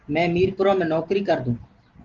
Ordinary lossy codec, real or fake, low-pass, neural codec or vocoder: Opus, 16 kbps; real; 7.2 kHz; none